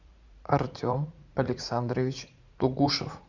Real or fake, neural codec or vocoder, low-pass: fake; vocoder, 44.1 kHz, 80 mel bands, Vocos; 7.2 kHz